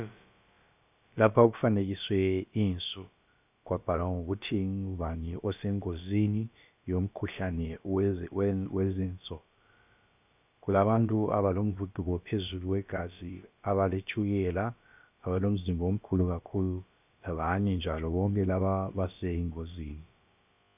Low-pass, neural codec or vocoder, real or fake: 3.6 kHz; codec, 16 kHz, about 1 kbps, DyCAST, with the encoder's durations; fake